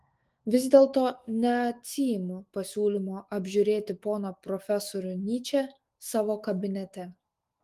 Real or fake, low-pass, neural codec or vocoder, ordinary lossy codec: fake; 14.4 kHz; autoencoder, 48 kHz, 128 numbers a frame, DAC-VAE, trained on Japanese speech; Opus, 24 kbps